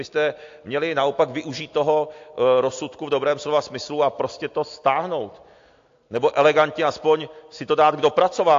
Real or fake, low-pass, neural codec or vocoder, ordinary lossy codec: real; 7.2 kHz; none; AAC, 48 kbps